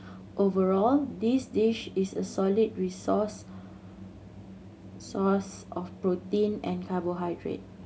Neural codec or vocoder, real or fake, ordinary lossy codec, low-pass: none; real; none; none